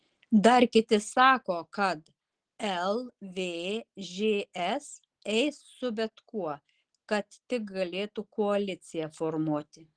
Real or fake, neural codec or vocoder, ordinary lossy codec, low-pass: real; none; Opus, 16 kbps; 9.9 kHz